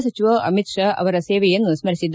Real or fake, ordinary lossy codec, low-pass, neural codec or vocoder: real; none; none; none